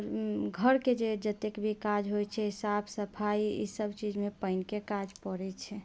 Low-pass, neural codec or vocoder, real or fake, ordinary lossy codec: none; none; real; none